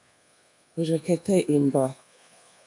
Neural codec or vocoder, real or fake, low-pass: codec, 24 kHz, 1.2 kbps, DualCodec; fake; 10.8 kHz